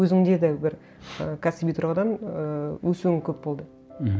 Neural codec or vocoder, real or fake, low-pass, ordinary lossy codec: none; real; none; none